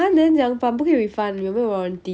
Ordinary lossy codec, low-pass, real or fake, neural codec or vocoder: none; none; real; none